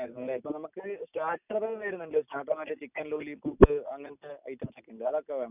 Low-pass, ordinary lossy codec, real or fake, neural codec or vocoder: 3.6 kHz; none; real; none